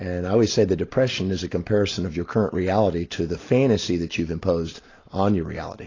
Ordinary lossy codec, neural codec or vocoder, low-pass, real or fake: AAC, 32 kbps; none; 7.2 kHz; real